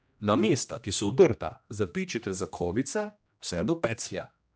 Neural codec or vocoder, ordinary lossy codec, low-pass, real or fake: codec, 16 kHz, 1 kbps, X-Codec, HuBERT features, trained on general audio; none; none; fake